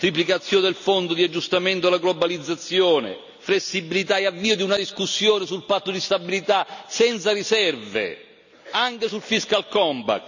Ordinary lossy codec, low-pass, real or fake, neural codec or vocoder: none; 7.2 kHz; real; none